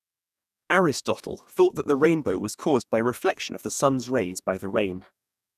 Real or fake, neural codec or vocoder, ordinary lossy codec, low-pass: fake; codec, 44.1 kHz, 2.6 kbps, DAC; none; 14.4 kHz